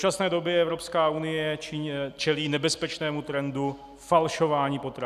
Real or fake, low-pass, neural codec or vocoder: real; 14.4 kHz; none